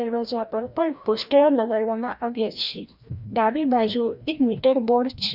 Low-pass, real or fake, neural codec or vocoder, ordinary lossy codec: 5.4 kHz; fake; codec, 16 kHz, 1 kbps, FreqCodec, larger model; none